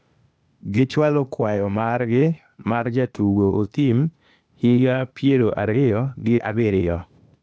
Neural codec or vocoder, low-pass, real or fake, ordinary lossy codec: codec, 16 kHz, 0.8 kbps, ZipCodec; none; fake; none